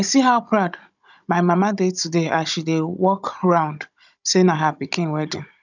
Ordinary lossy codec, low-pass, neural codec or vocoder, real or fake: none; 7.2 kHz; codec, 16 kHz, 16 kbps, FunCodec, trained on Chinese and English, 50 frames a second; fake